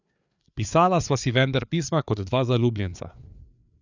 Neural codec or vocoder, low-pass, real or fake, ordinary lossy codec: codec, 16 kHz, 4 kbps, FreqCodec, larger model; 7.2 kHz; fake; none